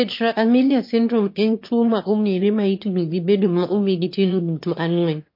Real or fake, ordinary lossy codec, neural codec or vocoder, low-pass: fake; MP3, 32 kbps; autoencoder, 22.05 kHz, a latent of 192 numbers a frame, VITS, trained on one speaker; 5.4 kHz